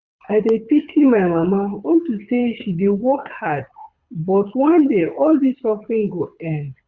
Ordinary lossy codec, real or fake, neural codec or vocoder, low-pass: Opus, 64 kbps; fake; codec, 24 kHz, 6 kbps, HILCodec; 7.2 kHz